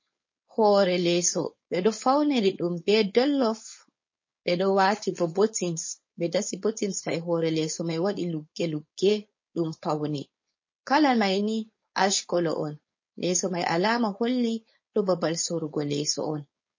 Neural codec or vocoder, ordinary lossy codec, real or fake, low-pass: codec, 16 kHz, 4.8 kbps, FACodec; MP3, 32 kbps; fake; 7.2 kHz